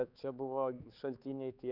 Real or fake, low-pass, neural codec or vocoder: fake; 5.4 kHz; codec, 16 kHz, 4 kbps, X-Codec, HuBERT features, trained on balanced general audio